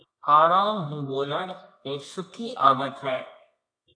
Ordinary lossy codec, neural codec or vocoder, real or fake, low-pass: AAC, 48 kbps; codec, 24 kHz, 0.9 kbps, WavTokenizer, medium music audio release; fake; 9.9 kHz